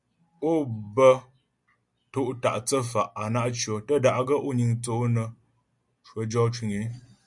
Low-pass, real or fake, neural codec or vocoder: 10.8 kHz; real; none